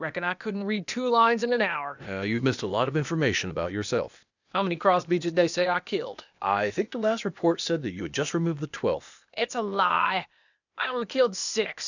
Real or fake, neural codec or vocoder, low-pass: fake; codec, 16 kHz, 0.8 kbps, ZipCodec; 7.2 kHz